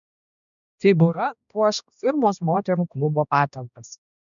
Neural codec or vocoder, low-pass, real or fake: codec, 16 kHz, 1 kbps, X-Codec, HuBERT features, trained on balanced general audio; 7.2 kHz; fake